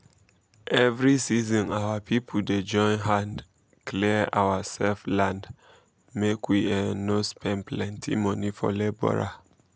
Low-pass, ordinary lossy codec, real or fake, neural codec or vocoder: none; none; real; none